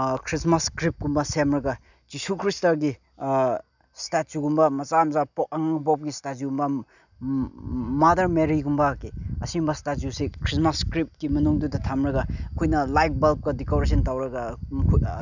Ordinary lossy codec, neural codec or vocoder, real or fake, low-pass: none; none; real; 7.2 kHz